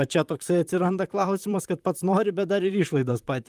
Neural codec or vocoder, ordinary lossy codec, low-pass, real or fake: vocoder, 44.1 kHz, 128 mel bands, Pupu-Vocoder; Opus, 32 kbps; 14.4 kHz; fake